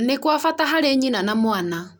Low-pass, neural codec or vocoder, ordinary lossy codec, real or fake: none; none; none; real